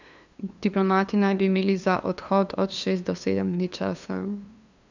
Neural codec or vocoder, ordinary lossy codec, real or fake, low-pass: codec, 16 kHz, 2 kbps, FunCodec, trained on LibriTTS, 25 frames a second; none; fake; 7.2 kHz